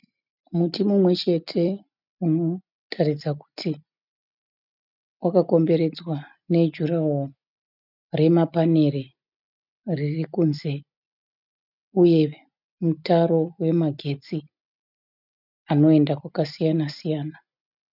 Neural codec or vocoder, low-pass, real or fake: none; 5.4 kHz; real